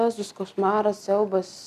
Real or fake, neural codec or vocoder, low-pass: real; none; 14.4 kHz